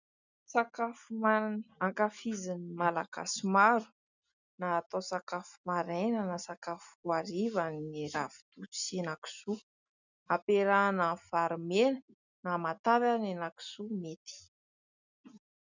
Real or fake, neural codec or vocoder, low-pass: real; none; 7.2 kHz